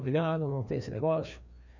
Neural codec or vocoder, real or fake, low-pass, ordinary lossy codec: codec, 16 kHz, 2 kbps, FreqCodec, larger model; fake; 7.2 kHz; none